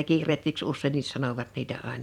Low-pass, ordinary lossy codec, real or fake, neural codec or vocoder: 19.8 kHz; none; fake; vocoder, 44.1 kHz, 128 mel bands every 256 samples, BigVGAN v2